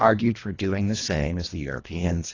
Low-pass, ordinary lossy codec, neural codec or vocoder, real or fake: 7.2 kHz; AAC, 32 kbps; codec, 24 kHz, 1.5 kbps, HILCodec; fake